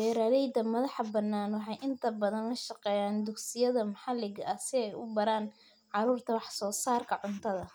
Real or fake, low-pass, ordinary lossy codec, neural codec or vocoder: real; none; none; none